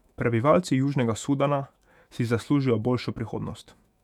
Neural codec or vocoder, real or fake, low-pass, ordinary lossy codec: autoencoder, 48 kHz, 128 numbers a frame, DAC-VAE, trained on Japanese speech; fake; 19.8 kHz; none